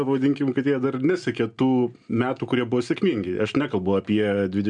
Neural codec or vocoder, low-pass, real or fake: none; 9.9 kHz; real